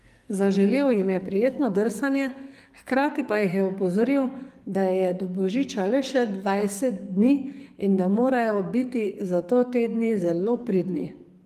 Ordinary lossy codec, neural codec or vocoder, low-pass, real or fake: Opus, 32 kbps; codec, 44.1 kHz, 2.6 kbps, SNAC; 14.4 kHz; fake